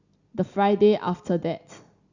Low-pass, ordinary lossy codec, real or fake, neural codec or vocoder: 7.2 kHz; Opus, 64 kbps; real; none